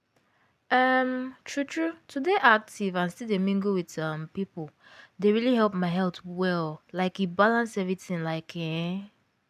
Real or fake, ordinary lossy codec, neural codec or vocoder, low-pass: real; none; none; 14.4 kHz